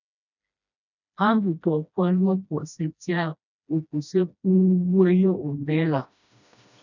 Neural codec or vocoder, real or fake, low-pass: codec, 16 kHz, 1 kbps, FreqCodec, smaller model; fake; 7.2 kHz